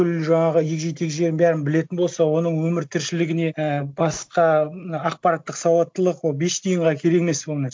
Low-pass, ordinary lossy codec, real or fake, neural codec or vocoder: none; none; real; none